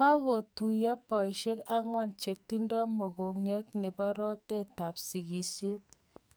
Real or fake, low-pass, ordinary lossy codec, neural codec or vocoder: fake; none; none; codec, 44.1 kHz, 2.6 kbps, SNAC